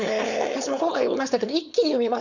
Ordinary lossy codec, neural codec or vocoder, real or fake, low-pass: none; codec, 16 kHz, 4.8 kbps, FACodec; fake; 7.2 kHz